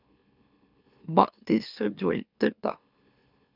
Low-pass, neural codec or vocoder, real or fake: 5.4 kHz; autoencoder, 44.1 kHz, a latent of 192 numbers a frame, MeloTTS; fake